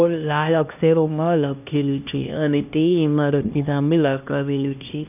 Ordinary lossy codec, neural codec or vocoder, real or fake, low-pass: none; codec, 16 kHz, 1 kbps, X-Codec, HuBERT features, trained on LibriSpeech; fake; 3.6 kHz